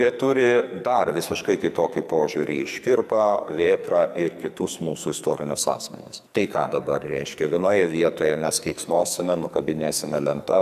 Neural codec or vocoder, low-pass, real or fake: codec, 44.1 kHz, 2.6 kbps, SNAC; 14.4 kHz; fake